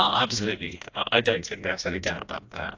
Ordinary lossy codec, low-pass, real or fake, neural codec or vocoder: AAC, 48 kbps; 7.2 kHz; fake; codec, 16 kHz, 1 kbps, FreqCodec, smaller model